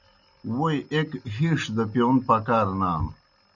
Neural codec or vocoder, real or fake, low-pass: none; real; 7.2 kHz